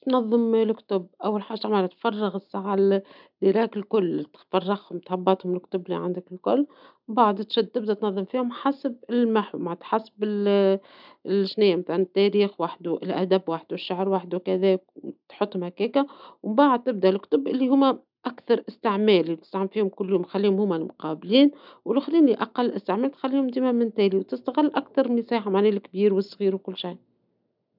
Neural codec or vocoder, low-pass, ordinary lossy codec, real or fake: none; 5.4 kHz; none; real